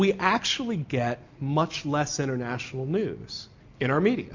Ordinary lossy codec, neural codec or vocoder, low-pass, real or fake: MP3, 48 kbps; none; 7.2 kHz; real